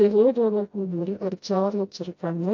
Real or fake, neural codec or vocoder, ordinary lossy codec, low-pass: fake; codec, 16 kHz, 0.5 kbps, FreqCodec, smaller model; MP3, 48 kbps; 7.2 kHz